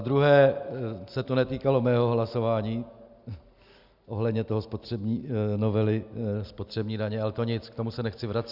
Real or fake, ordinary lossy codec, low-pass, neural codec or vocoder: real; Opus, 64 kbps; 5.4 kHz; none